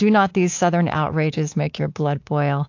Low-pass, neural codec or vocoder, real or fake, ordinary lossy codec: 7.2 kHz; codec, 24 kHz, 3.1 kbps, DualCodec; fake; AAC, 48 kbps